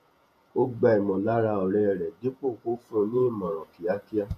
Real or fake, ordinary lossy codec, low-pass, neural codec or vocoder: fake; MP3, 96 kbps; 14.4 kHz; vocoder, 48 kHz, 128 mel bands, Vocos